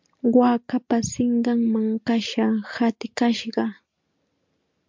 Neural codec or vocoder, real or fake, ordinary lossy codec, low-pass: none; real; MP3, 64 kbps; 7.2 kHz